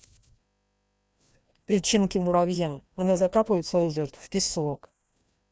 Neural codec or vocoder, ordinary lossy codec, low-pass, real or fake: codec, 16 kHz, 1 kbps, FreqCodec, larger model; none; none; fake